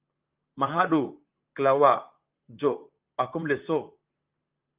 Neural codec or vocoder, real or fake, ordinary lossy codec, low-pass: vocoder, 44.1 kHz, 128 mel bands, Pupu-Vocoder; fake; Opus, 32 kbps; 3.6 kHz